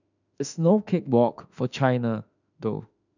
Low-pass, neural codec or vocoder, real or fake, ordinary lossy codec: 7.2 kHz; autoencoder, 48 kHz, 32 numbers a frame, DAC-VAE, trained on Japanese speech; fake; none